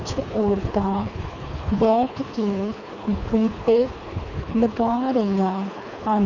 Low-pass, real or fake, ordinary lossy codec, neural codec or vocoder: 7.2 kHz; fake; none; codec, 24 kHz, 3 kbps, HILCodec